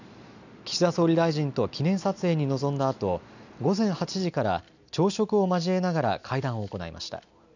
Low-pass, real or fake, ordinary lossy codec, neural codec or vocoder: 7.2 kHz; real; none; none